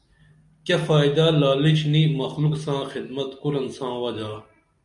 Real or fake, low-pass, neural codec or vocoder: real; 10.8 kHz; none